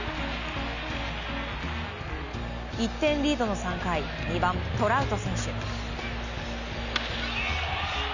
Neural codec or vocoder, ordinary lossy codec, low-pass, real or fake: none; none; 7.2 kHz; real